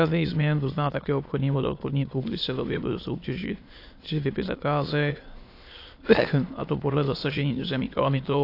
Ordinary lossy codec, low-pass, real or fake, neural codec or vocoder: AAC, 32 kbps; 5.4 kHz; fake; autoencoder, 22.05 kHz, a latent of 192 numbers a frame, VITS, trained on many speakers